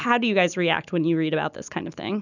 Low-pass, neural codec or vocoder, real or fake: 7.2 kHz; none; real